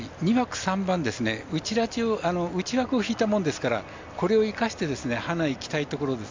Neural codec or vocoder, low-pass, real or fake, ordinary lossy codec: none; 7.2 kHz; real; MP3, 64 kbps